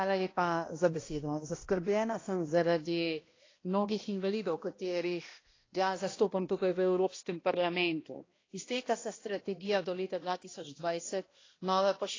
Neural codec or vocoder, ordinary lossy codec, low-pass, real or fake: codec, 16 kHz, 1 kbps, X-Codec, HuBERT features, trained on balanced general audio; AAC, 32 kbps; 7.2 kHz; fake